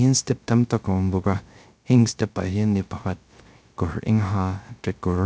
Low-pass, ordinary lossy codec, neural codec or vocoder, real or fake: none; none; codec, 16 kHz, 0.3 kbps, FocalCodec; fake